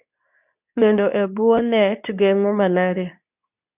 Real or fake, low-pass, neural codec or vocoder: fake; 3.6 kHz; codec, 24 kHz, 0.9 kbps, WavTokenizer, medium speech release version 2